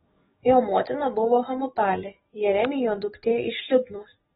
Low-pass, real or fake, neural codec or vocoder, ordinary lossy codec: 19.8 kHz; fake; codec, 44.1 kHz, 7.8 kbps, DAC; AAC, 16 kbps